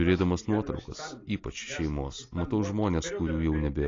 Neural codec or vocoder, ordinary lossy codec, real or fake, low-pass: none; AAC, 32 kbps; real; 7.2 kHz